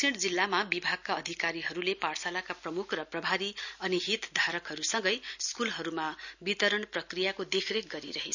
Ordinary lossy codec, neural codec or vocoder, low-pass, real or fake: none; none; 7.2 kHz; real